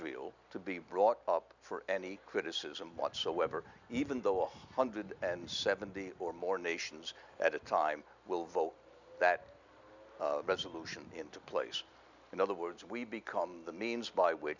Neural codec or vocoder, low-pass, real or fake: none; 7.2 kHz; real